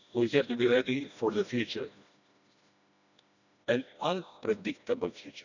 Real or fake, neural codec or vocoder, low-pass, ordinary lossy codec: fake; codec, 16 kHz, 1 kbps, FreqCodec, smaller model; 7.2 kHz; none